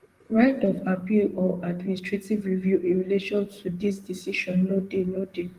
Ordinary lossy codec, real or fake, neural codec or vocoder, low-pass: Opus, 24 kbps; fake; vocoder, 44.1 kHz, 128 mel bands, Pupu-Vocoder; 14.4 kHz